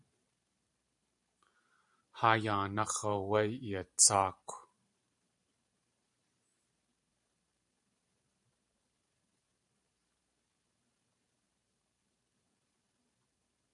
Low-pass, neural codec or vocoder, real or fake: 10.8 kHz; vocoder, 44.1 kHz, 128 mel bands every 512 samples, BigVGAN v2; fake